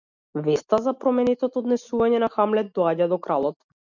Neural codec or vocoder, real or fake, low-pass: none; real; 7.2 kHz